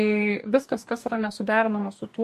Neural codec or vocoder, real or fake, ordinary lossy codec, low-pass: codec, 44.1 kHz, 2.6 kbps, DAC; fake; MP3, 64 kbps; 14.4 kHz